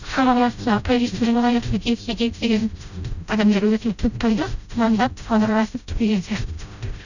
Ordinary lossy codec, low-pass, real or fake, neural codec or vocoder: none; 7.2 kHz; fake; codec, 16 kHz, 0.5 kbps, FreqCodec, smaller model